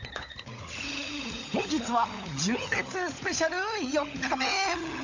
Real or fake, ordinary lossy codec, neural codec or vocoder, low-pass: fake; AAC, 48 kbps; codec, 16 kHz, 16 kbps, FunCodec, trained on LibriTTS, 50 frames a second; 7.2 kHz